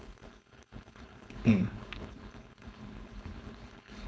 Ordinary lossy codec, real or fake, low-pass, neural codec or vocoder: none; fake; none; codec, 16 kHz, 4.8 kbps, FACodec